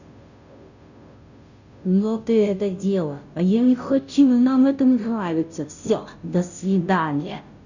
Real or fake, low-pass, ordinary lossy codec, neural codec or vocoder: fake; 7.2 kHz; none; codec, 16 kHz, 0.5 kbps, FunCodec, trained on Chinese and English, 25 frames a second